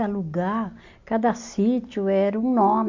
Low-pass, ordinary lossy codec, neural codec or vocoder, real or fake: 7.2 kHz; AAC, 48 kbps; none; real